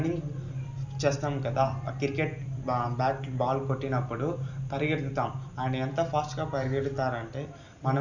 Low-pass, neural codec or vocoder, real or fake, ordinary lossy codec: 7.2 kHz; none; real; none